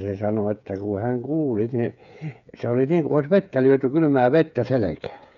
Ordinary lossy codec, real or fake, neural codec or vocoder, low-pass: none; fake; codec, 16 kHz, 8 kbps, FreqCodec, smaller model; 7.2 kHz